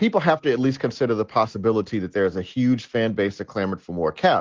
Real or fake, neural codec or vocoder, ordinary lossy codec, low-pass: real; none; Opus, 16 kbps; 7.2 kHz